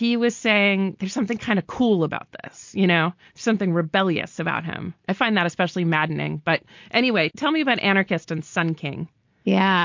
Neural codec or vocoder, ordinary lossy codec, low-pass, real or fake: none; MP3, 48 kbps; 7.2 kHz; real